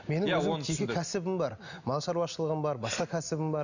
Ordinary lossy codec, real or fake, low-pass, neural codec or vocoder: none; real; 7.2 kHz; none